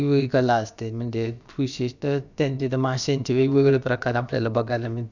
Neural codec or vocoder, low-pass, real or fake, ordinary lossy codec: codec, 16 kHz, about 1 kbps, DyCAST, with the encoder's durations; 7.2 kHz; fake; none